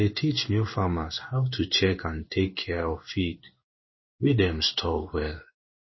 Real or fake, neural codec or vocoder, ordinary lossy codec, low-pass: fake; codec, 16 kHz in and 24 kHz out, 1 kbps, XY-Tokenizer; MP3, 24 kbps; 7.2 kHz